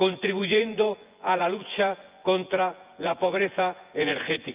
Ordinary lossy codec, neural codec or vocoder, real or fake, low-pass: Opus, 32 kbps; vocoder, 24 kHz, 100 mel bands, Vocos; fake; 3.6 kHz